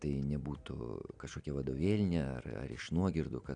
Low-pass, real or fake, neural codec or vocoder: 9.9 kHz; real; none